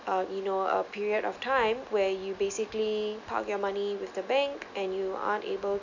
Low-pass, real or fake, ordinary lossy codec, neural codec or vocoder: 7.2 kHz; real; none; none